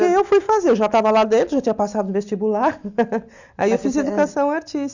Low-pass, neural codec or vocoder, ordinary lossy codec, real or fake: 7.2 kHz; none; none; real